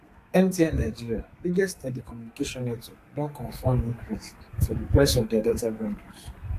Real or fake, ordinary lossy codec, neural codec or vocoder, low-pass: fake; AAC, 64 kbps; codec, 32 kHz, 1.9 kbps, SNAC; 14.4 kHz